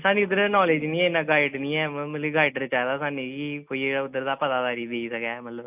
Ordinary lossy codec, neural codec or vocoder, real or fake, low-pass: none; none; real; 3.6 kHz